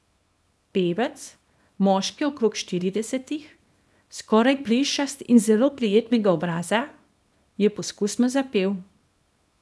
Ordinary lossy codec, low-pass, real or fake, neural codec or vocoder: none; none; fake; codec, 24 kHz, 0.9 kbps, WavTokenizer, small release